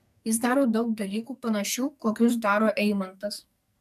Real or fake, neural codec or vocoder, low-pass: fake; codec, 44.1 kHz, 2.6 kbps, SNAC; 14.4 kHz